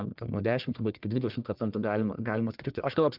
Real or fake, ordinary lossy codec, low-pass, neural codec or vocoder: fake; Opus, 32 kbps; 5.4 kHz; codec, 44.1 kHz, 1.7 kbps, Pupu-Codec